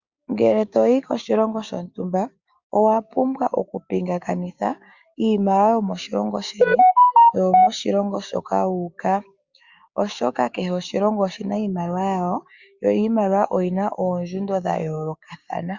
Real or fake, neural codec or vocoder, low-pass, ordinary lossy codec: fake; codec, 16 kHz, 6 kbps, DAC; 7.2 kHz; Opus, 64 kbps